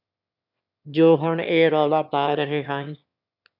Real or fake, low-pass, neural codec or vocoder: fake; 5.4 kHz; autoencoder, 22.05 kHz, a latent of 192 numbers a frame, VITS, trained on one speaker